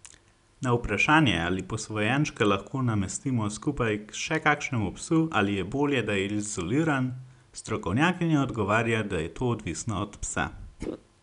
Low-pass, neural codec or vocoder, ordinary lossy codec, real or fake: 10.8 kHz; none; none; real